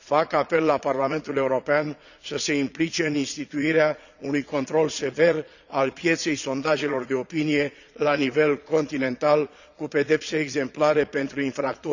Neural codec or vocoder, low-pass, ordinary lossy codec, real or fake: vocoder, 22.05 kHz, 80 mel bands, Vocos; 7.2 kHz; none; fake